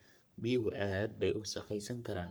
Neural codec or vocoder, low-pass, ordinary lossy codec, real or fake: codec, 44.1 kHz, 3.4 kbps, Pupu-Codec; none; none; fake